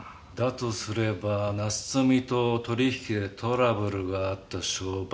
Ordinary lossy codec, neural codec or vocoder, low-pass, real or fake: none; none; none; real